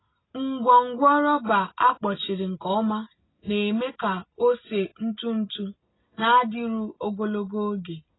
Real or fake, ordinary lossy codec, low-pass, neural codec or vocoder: real; AAC, 16 kbps; 7.2 kHz; none